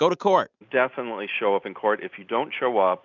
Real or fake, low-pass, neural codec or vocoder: real; 7.2 kHz; none